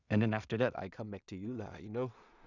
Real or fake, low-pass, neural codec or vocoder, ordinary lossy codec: fake; 7.2 kHz; codec, 16 kHz in and 24 kHz out, 0.4 kbps, LongCat-Audio-Codec, two codebook decoder; none